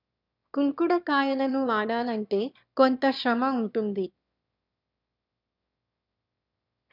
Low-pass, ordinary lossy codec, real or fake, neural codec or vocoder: 5.4 kHz; none; fake; autoencoder, 22.05 kHz, a latent of 192 numbers a frame, VITS, trained on one speaker